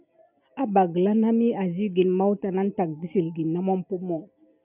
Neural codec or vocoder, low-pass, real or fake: none; 3.6 kHz; real